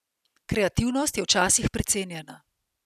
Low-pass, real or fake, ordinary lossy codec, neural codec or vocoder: 14.4 kHz; real; none; none